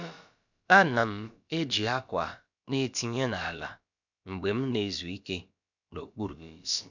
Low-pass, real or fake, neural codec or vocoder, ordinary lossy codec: 7.2 kHz; fake; codec, 16 kHz, about 1 kbps, DyCAST, with the encoder's durations; none